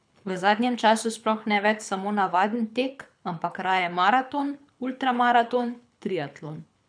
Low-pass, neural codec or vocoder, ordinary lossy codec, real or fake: 9.9 kHz; codec, 24 kHz, 6 kbps, HILCodec; none; fake